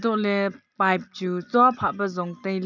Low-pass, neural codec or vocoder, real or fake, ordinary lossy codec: 7.2 kHz; none; real; none